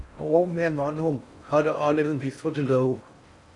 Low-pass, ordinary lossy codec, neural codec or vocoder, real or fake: 10.8 kHz; MP3, 96 kbps; codec, 16 kHz in and 24 kHz out, 0.6 kbps, FocalCodec, streaming, 4096 codes; fake